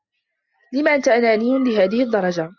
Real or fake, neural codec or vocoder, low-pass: real; none; 7.2 kHz